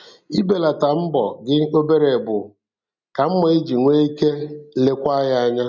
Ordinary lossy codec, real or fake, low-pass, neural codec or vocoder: none; real; 7.2 kHz; none